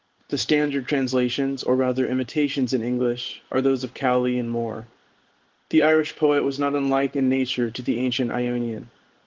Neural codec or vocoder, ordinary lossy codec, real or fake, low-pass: codec, 16 kHz in and 24 kHz out, 1 kbps, XY-Tokenizer; Opus, 16 kbps; fake; 7.2 kHz